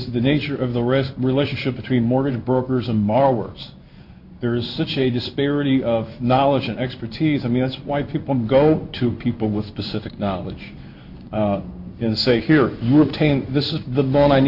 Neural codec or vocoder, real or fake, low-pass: codec, 16 kHz in and 24 kHz out, 1 kbps, XY-Tokenizer; fake; 5.4 kHz